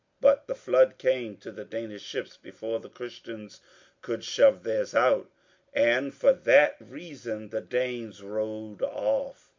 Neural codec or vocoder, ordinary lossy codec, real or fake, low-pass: none; MP3, 64 kbps; real; 7.2 kHz